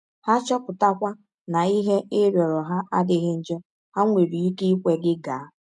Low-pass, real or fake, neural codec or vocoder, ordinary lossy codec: 10.8 kHz; real; none; none